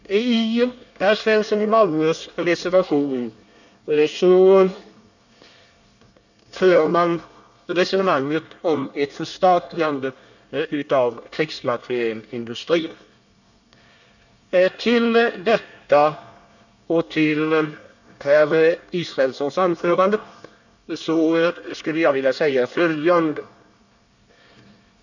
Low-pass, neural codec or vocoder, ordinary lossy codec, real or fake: 7.2 kHz; codec, 24 kHz, 1 kbps, SNAC; none; fake